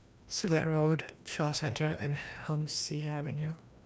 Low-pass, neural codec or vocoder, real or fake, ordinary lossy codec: none; codec, 16 kHz, 1 kbps, FreqCodec, larger model; fake; none